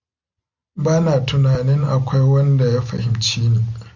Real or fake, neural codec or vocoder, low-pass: real; none; 7.2 kHz